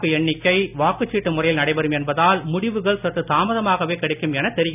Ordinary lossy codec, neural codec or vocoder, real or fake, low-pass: none; none; real; 3.6 kHz